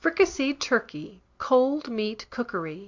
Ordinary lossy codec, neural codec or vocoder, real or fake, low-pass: Opus, 64 kbps; none; real; 7.2 kHz